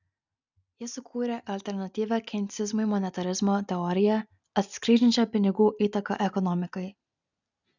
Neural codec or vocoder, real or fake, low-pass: none; real; 7.2 kHz